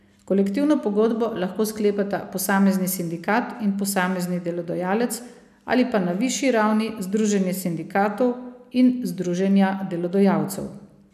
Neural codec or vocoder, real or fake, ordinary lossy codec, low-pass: none; real; none; 14.4 kHz